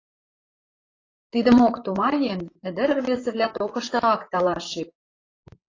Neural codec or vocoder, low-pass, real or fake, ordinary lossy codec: vocoder, 44.1 kHz, 128 mel bands, Pupu-Vocoder; 7.2 kHz; fake; AAC, 32 kbps